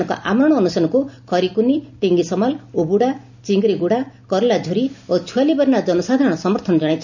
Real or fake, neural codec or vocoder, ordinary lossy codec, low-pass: real; none; none; 7.2 kHz